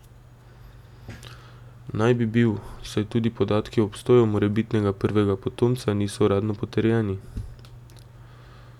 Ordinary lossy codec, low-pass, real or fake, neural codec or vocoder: none; 19.8 kHz; real; none